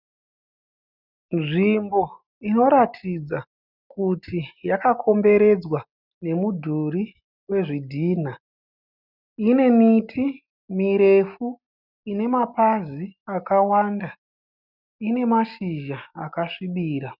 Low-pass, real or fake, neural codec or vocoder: 5.4 kHz; real; none